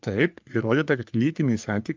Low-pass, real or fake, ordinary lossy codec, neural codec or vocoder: 7.2 kHz; fake; Opus, 24 kbps; codec, 44.1 kHz, 3.4 kbps, Pupu-Codec